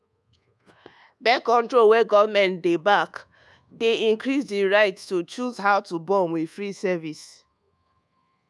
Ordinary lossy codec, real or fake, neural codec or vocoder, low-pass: none; fake; codec, 24 kHz, 1.2 kbps, DualCodec; none